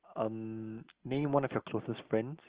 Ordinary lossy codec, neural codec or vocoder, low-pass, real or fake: Opus, 16 kbps; none; 3.6 kHz; real